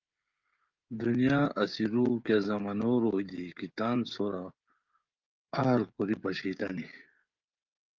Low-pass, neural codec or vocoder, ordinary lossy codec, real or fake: 7.2 kHz; codec, 16 kHz, 16 kbps, FreqCodec, smaller model; Opus, 24 kbps; fake